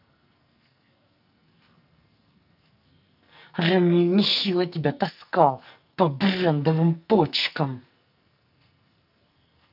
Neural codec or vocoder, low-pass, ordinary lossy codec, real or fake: codec, 44.1 kHz, 2.6 kbps, SNAC; 5.4 kHz; none; fake